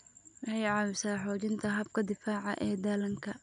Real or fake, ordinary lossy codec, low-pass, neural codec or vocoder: real; none; 9.9 kHz; none